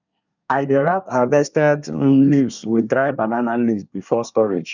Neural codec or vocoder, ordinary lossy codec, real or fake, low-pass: codec, 24 kHz, 1 kbps, SNAC; none; fake; 7.2 kHz